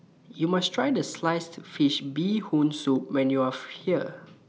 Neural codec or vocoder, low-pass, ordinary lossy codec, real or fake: none; none; none; real